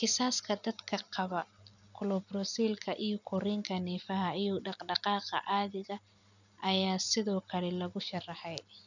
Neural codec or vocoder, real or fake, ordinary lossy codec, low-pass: none; real; none; 7.2 kHz